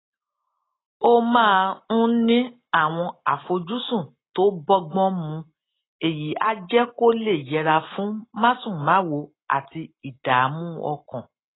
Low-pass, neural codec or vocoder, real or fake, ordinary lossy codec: 7.2 kHz; none; real; AAC, 16 kbps